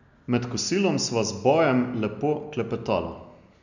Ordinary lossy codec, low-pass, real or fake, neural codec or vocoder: none; 7.2 kHz; real; none